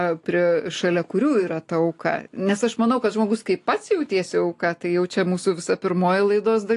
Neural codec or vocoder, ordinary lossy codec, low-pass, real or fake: none; AAC, 48 kbps; 10.8 kHz; real